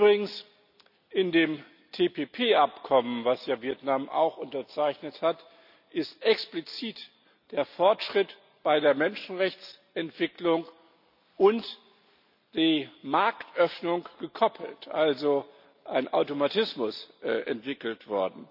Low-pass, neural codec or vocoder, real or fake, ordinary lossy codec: 5.4 kHz; none; real; none